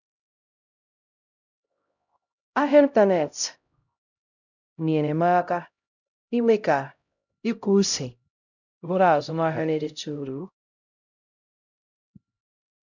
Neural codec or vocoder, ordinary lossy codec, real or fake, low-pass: codec, 16 kHz, 0.5 kbps, X-Codec, HuBERT features, trained on LibriSpeech; none; fake; 7.2 kHz